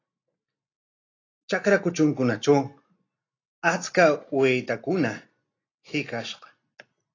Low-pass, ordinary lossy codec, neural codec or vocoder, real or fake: 7.2 kHz; AAC, 32 kbps; none; real